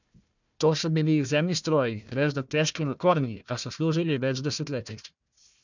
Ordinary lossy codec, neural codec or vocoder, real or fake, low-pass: none; codec, 16 kHz, 1 kbps, FunCodec, trained on Chinese and English, 50 frames a second; fake; 7.2 kHz